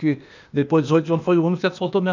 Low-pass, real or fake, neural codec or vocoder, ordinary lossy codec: 7.2 kHz; fake; codec, 16 kHz, 0.8 kbps, ZipCodec; none